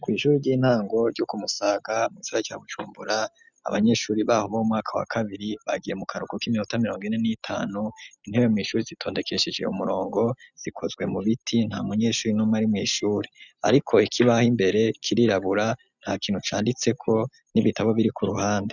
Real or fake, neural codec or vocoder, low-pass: real; none; 7.2 kHz